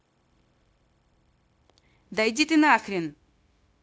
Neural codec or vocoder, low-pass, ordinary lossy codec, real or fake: codec, 16 kHz, 0.9 kbps, LongCat-Audio-Codec; none; none; fake